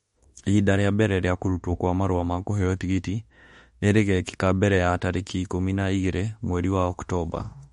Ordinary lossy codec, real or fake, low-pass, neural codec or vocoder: MP3, 48 kbps; fake; 19.8 kHz; autoencoder, 48 kHz, 32 numbers a frame, DAC-VAE, trained on Japanese speech